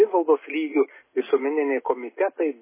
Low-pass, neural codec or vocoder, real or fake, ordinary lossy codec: 3.6 kHz; none; real; MP3, 16 kbps